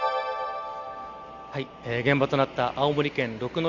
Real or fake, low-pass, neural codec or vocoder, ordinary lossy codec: real; 7.2 kHz; none; Opus, 64 kbps